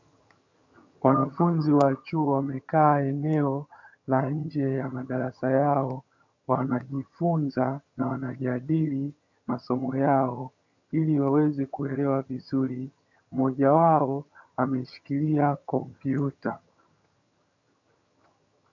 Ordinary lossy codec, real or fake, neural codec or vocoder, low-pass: MP3, 64 kbps; fake; vocoder, 22.05 kHz, 80 mel bands, HiFi-GAN; 7.2 kHz